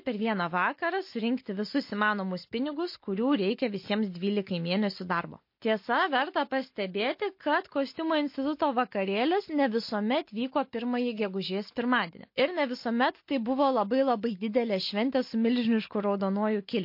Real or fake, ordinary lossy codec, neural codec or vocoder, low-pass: real; MP3, 32 kbps; none; 5.4 kHz